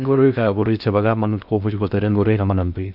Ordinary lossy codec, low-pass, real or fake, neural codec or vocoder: none; 5.4 kHz; fake; codec, 16 kHz in and 24 kHz out, 0.6 kbps, FocalCodec, streaming, 2048 codes